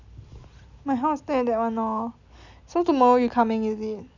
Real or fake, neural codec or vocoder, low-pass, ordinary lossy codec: real; none; 7.2 kHz; none